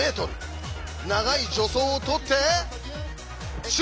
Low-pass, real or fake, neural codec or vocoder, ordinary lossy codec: none; real; none; none